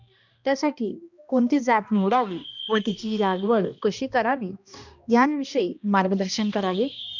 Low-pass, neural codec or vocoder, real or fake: 7.2 kHz; codec, 16 kHz, 1 kbps, X-Codec, HuBERT features, trained on balanced general audio; fake